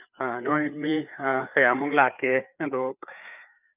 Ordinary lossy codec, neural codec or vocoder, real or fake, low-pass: none; codec, 16 kHz, 4 kbps, FreqCodec, larger model; fake; 3.6 kHz